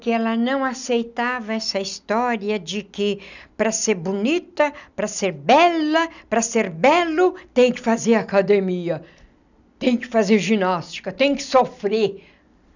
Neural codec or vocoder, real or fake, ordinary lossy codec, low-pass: none; real; none; 7.2 kHz